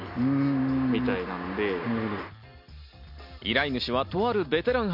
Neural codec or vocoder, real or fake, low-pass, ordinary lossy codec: none; real; 5.4 kHz; MP3, 48 kbps